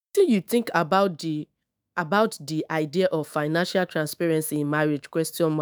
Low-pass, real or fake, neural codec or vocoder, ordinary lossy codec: none; fake; autoencoder, 48 kHz, 128 numbers a frame, DAC-VAE, trained on Japanese speech; none